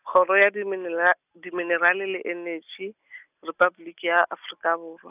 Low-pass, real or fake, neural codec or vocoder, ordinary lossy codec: 3.6 kHz; real; none; none